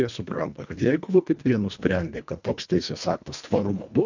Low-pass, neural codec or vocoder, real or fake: 7.2 kHz; codec, 24 kHz, 1.5 kbps, HILCodec; fake